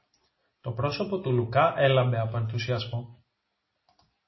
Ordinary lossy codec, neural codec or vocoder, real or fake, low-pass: MP3, 24 kbps; none; real; 7.2 kHz